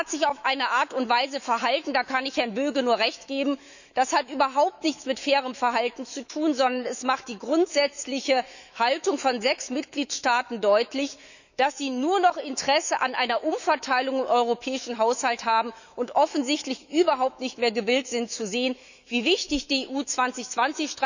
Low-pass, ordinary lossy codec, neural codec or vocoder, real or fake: 7.2 kHz; none; autoencoder, 48 kHz, 128 numbers a frame, DAC-VAE, trained on Japanese speech; fake